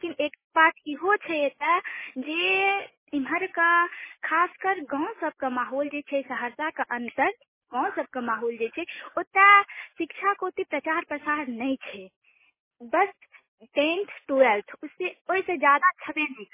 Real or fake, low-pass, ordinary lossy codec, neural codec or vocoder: real; 3.6 kHz; MP3, 16 kbps; none